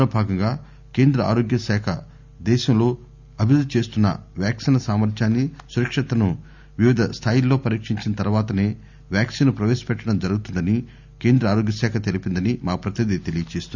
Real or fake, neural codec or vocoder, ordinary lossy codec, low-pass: real; none; none; 7.2 kHz